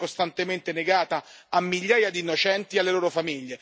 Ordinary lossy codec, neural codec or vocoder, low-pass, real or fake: none; none; none; real